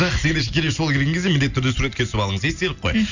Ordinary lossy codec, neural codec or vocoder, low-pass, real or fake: none; none; 7.2 kHz; real